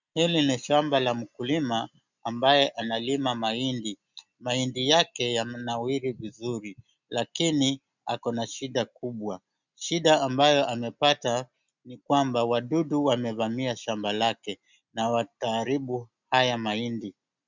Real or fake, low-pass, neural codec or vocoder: real; 7.2 kHz; none